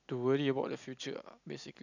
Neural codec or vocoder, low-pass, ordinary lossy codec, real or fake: none; 7.2 kHz; none; real